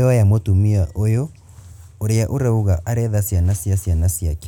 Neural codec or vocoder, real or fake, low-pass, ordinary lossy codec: none; real; 19.8 kHz; none